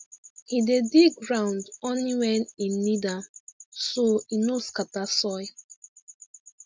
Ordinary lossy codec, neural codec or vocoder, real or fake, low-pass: none; none; real; none